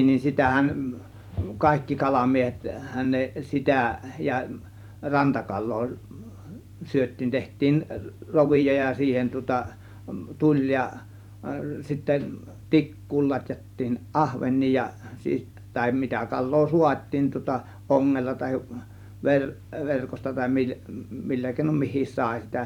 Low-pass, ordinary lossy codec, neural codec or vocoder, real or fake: 19.8 kHz; none; vocoder, 44.1 kHz, 128 mel bands every 512 samples, BigVGAN v2; fake